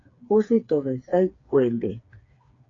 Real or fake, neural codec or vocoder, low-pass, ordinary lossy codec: fake; codec, 16 kHz, 4 kbps, FreqCodec, smaller model; 7.2 kHz; AAC, 32 kbps